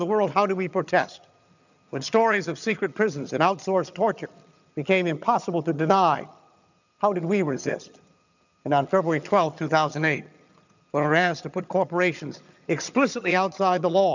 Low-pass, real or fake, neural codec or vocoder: 7.2 kHz; fake; vocoder, 22.05 kHz, 80 mel bands, HiFi-GAN